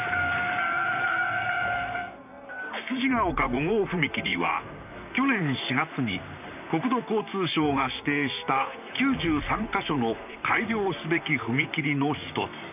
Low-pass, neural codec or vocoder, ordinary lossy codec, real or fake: 3.6 kHz; vocoder, 44.1 kHz, 128 mel bands, Pupu-Vocoder; none; fake